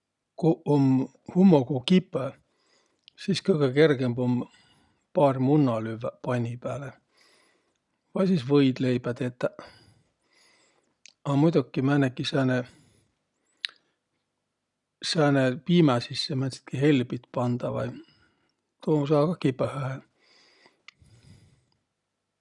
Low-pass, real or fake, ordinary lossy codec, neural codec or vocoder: 10.8 kHz; real; none; none